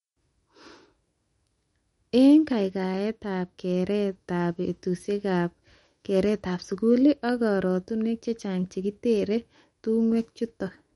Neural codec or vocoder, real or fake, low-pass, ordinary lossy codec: autoencoder, 48 kHz, 128 numbers a frame, DAC-VAE, trained on Japanese speech; fake; 19.8 kHz; MP3, 48 kbps